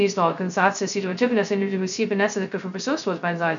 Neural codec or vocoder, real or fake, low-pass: codec, 16 kHz, 0.2 kbps, FocalCodec; fake; 7.2 kHz